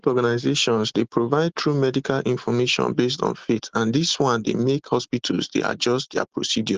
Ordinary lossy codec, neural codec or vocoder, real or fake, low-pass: Opus, 16 kbps; none; real; 7.2 kHz